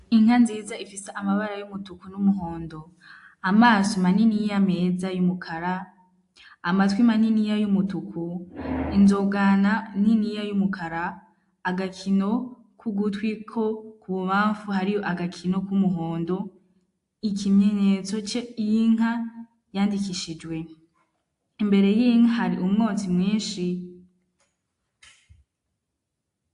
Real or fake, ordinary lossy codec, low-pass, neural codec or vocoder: real; AAC, 64 kbps; 10.8 kHz; none